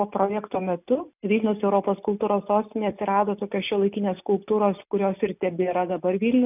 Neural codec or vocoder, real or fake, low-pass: none; real; 3.6 kHz